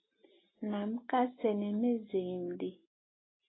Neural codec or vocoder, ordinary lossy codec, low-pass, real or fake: none; AAC, 16 kbps; 7.2 kHz; real